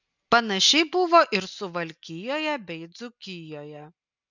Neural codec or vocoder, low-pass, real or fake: none; 7.2 kHz; real